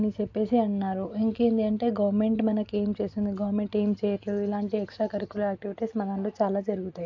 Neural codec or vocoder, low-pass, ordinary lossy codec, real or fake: none; 7.2 kHz; AAC, 48 kbps; real